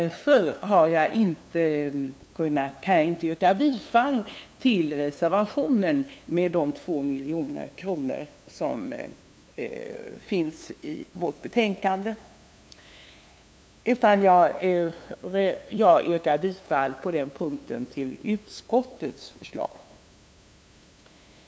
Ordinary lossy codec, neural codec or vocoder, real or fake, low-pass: none; codec, 16 kHz, 2 kbps, FunCodec, trained on LibriTTS, 25 frames a second; fake; none